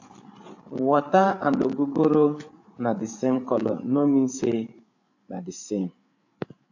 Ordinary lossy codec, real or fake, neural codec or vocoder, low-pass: AAC, 48 kbps; fake; codec, 16 kHz, 8 kbps, FreqCodec, larger model; 7.2 kHz